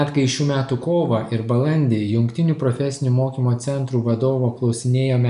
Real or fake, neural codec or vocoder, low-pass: real; none; 10.8 kHz